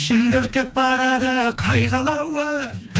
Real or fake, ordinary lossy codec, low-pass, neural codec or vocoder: fake; none; none; codec, 16 kHz, 2 kbps, FreqCodec, smaller model